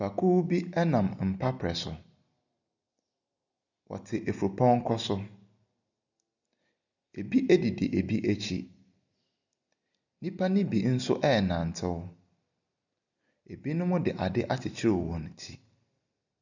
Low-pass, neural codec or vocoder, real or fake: 7.2 kHz; none; real